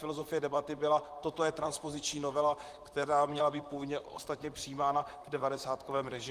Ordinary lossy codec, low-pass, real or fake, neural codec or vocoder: Opus, 32 kbps; 14.4 kHz; fake; vocoder, 44.1 kHz, 128 mel bands, Pupu-Vocoder